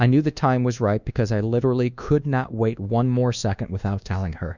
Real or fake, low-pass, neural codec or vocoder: fake; 7.2 kHz; codec, 24 kHz, 1.2 kbps, DualCodec